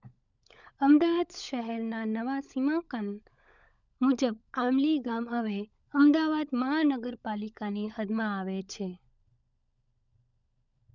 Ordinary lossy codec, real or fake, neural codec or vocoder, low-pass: none; fake; codec, 16 kHz, 16 kbps, FunCodec, trained on LibriTTS, 50 frames a second; 7.2 kHz